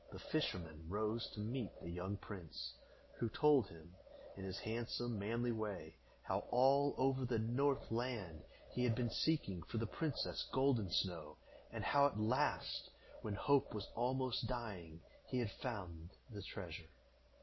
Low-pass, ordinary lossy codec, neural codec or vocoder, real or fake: 7.2 kHz; MP3, 24 kbps; none; real